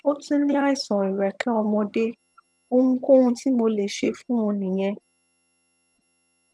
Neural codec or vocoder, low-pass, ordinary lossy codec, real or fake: vocoder, 22.05 kHz, 80 mel bands, HiFi-GAN; none; none; fake